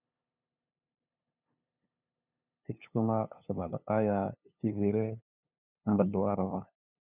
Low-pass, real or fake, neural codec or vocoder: 3.6 kHz; fake; codec, 16 kHz, 2 kbps, FunCodec, trained on LibriTTS, 25 frames a second